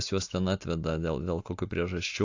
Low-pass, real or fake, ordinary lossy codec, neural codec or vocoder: 7.2 kHz; real; AAC, 48 kbps; none